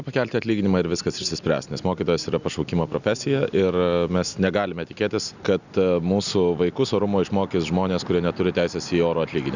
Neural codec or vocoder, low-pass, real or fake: none; 7.2 kHz; real